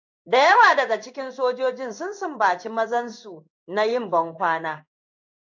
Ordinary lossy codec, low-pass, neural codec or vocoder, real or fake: AAC, 48 kbps; 7.2 kHz; codec, 16 kHz in and 24 kHz out, 1 kbps, XY-Tokenizer; fake